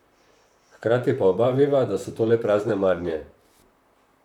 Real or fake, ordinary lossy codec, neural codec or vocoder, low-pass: fake; none; vocoder, 44.1 kHz, 128 mel bands, Pupu-Vocoder; 19.8 kHz